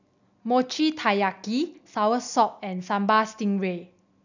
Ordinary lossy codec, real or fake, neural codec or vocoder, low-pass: none; real; none; 7.2 kHz